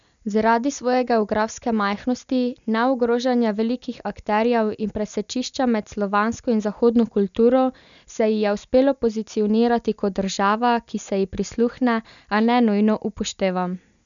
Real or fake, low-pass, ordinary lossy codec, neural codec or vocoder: real; 7.2 kHz; none; none